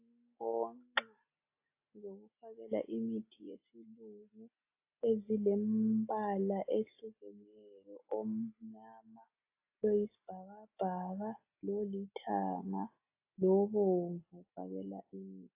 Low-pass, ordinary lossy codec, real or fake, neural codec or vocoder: 3.6 kHz; AAC, 24 kbps; real; none